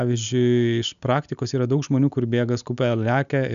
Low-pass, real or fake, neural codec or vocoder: 7.2 kHz; real; none